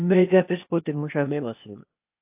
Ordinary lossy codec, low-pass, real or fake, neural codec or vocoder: AAC, 32 kbps; 3.6 kHz; fake; codec, 16 kHz, 0.8 kbps, ZipCodec